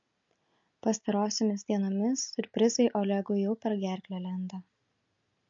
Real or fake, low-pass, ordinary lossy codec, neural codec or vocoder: real; 7.2 kHz; MP3, 48 kbps; none